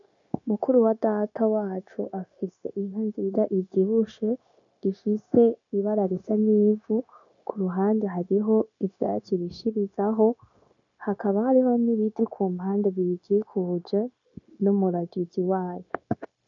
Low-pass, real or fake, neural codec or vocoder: 7.2 kHz; fake; codec, 16 kHz, 0.9 kbps, LongCat-Audio-Codec